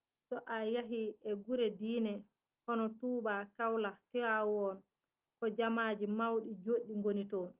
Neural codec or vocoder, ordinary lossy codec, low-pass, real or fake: none; Opus, 24 kbps; 3.6 kHz; real